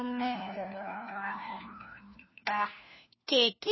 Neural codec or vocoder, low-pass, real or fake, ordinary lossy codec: codec, 16 kHz, 1 kbps, FunCodec, trained on LibriTTS, 50 frames a second; 7.2 kHz; fake; MP3, 24 kbps